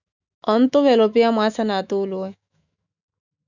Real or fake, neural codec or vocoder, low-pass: fake; autoencoder, 48 kHz, 128 numbers a frame, DAC-VAE, trained on Japanese speech; 7.2 kHz